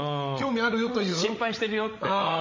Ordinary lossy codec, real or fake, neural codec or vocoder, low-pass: MP3, 32 kbps; fake; codec, 16 kHz, 16 kbps, FreqCodec, larger model; 7.2 kHz